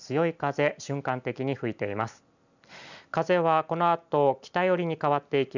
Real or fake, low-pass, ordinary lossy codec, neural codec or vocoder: real; 7.2 kHz; none; none